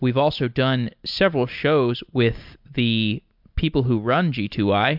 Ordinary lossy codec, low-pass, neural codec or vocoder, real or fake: MP3, 48 kbps; 5.4 kHz; none; real